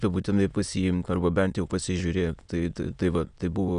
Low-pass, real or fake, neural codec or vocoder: 9.9 kHz; fake; autoencoder, 22.05 kHz, a latent of 192 numbers a frame, VITS, trained on many speakers